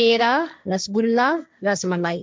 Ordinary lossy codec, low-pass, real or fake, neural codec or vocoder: none; none; fake; codec, 16 kHz, 1.1 kbps, Voila-Tokenizer